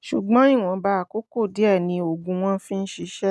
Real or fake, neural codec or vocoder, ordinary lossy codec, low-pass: real; none; none; none